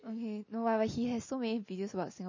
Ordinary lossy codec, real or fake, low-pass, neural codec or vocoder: MP3, 32 kbps; real; 7.2 kHz; none